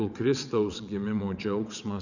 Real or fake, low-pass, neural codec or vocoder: fake; 7.2 kHz; vocoder, 22.05 kHz, 80 mel bands, WaveNeXt